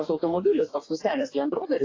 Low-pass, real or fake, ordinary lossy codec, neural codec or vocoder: 7.2 kHz; fake; AAC, 32 kbps; codec, 44.1 kHz, 2.6 kbps, DAC